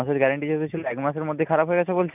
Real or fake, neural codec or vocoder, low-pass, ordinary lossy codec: real; none; 3.6 kHz; none